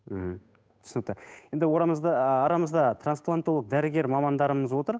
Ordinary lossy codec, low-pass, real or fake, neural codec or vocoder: none; none; fake; codec, 16 kHz, 8 kbps, FunCodec, trained on Chinese and English, 25 frames a second